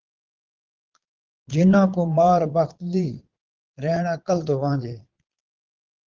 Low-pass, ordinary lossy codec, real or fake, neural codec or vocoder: 7.2 kHz; Opus, 16 kbps; fake; vocoder, 22.05 kHz, 80 mel bands, Vocos